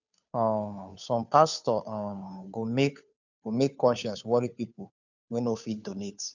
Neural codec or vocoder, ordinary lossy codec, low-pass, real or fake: codec, 16 kHz, 2 kbps, FunCodec, trained on Chinese and English, 25 frames a second; none; 7.2 kHz; fake